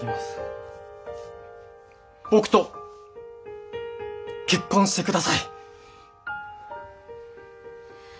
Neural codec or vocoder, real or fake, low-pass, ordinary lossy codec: none; real; none; none